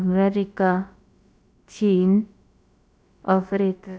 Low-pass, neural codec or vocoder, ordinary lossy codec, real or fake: none; codec, 16 kHz, about 1 kbps, DyCAST, with the encoder's durations; none; fake